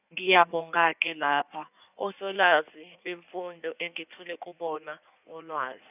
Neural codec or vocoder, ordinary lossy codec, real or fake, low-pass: codec, 16 kHz in and 24 kHz out, 1.1 kbps, FireRedTTS-2 codec; none; fake; 3.6 kHz